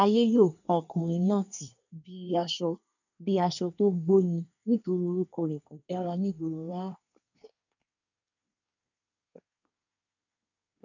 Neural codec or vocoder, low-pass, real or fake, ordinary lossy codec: codec, 24 kHz, 1 kbps, SNAC; 7.2 kHz; fake; none